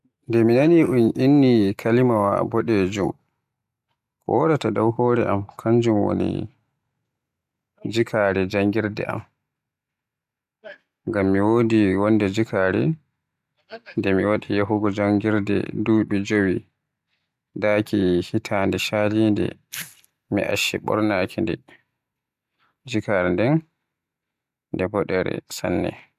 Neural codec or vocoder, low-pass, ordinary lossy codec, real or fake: none; 14.4 kHz; MP3, 96 kbps; real